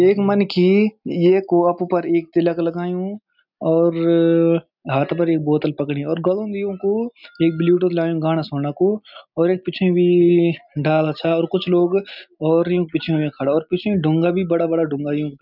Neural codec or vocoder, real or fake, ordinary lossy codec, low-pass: none; real; none; 5.4 kHz